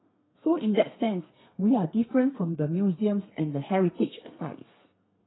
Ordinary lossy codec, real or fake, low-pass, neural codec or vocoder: AAC, 16 kbps; fake; 7.2 kHz; codec, 32 kHz, 1.9 kbps, SNAC